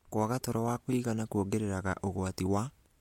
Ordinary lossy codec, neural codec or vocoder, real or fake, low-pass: MP3, 64 kbps; none; real; 19.8 kHz